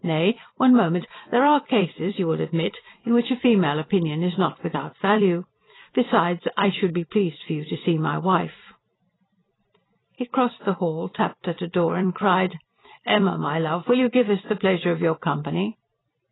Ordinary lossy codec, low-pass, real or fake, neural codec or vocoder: AAC, 16 kbps; 7.2 kHz; fake; vocoder, 44.1 kHz, 128 mel bands every 256 samples, BigVGAN v2